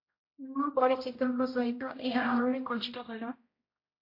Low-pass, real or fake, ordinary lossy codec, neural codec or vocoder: 5.4 kHz; fake; MP3, 32 kbps; codec, 16 kHz, 0.5 kbps, X-Codec, HuBERT features, trained on general audio